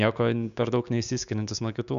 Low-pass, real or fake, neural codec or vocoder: 7.2 kHz; fake; codec, 16 kHz, 6 kbps, DAC